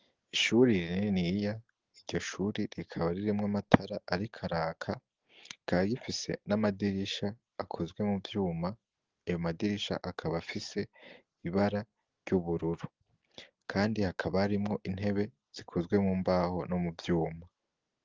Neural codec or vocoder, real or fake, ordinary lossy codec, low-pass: none; real; Opus, 16 kbps; 7.2 kHz